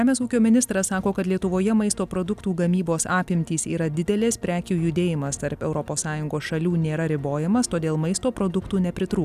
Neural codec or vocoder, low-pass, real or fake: none; 14.4 kHz; real